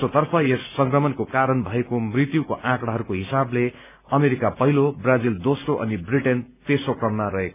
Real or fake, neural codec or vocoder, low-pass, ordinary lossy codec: real; none; 3.6 kHz; none